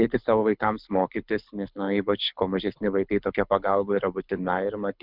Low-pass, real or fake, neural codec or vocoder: 5.4 kHz; fake; codec, 24 kHz, 6 kbps, HILCodec